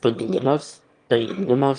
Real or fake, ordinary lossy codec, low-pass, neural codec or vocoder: fake; Opus, 32 kbps; 9.9 kHz; autoencoder, 22.05 kHz, a latent of 192 numbers a frame, VITS, trained on one speaker